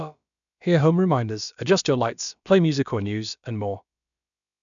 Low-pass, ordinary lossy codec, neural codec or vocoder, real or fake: 7.2 kHz; none; codec, 16 kHz, about 1 kbps, DyCAST, with the encoder's durations; fake